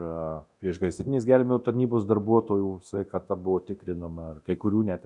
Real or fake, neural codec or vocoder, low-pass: fake; codec, 24 kHz, 0.9 kbps, DualCodec; 10.8 kHz